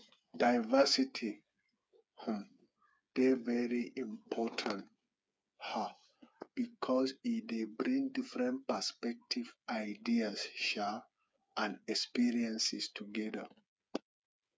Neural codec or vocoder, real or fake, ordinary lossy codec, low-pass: codec, 16 kHz, 8 kbps, FreqCodec, smaller model; fake; none; none